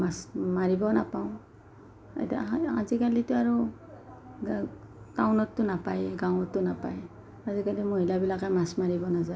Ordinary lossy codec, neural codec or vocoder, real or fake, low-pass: none; none; real; none